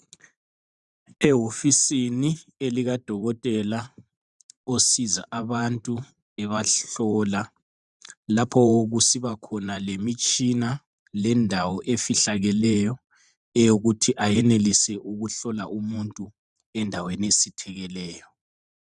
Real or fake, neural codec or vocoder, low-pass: fake; vocoder, 44.1 kHz, 128 mel bands every 512 samples, BigVGAN v2; 10.8 kHz